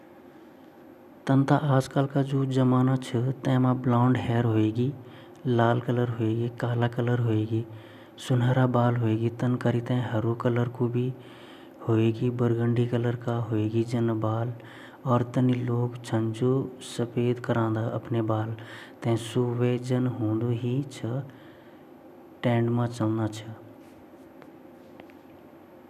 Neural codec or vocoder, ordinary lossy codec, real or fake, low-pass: none; none; real; 14.4 kHz